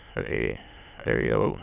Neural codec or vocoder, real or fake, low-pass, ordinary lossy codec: autoencoder, 22.05 kHz, a latent of 192 numbers a frame, VITS, trained on many speakers; fake; 3.6 kHz; none